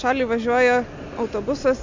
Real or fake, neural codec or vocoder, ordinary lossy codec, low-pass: real; none; MP3, 48 kbps; 7.2 kHz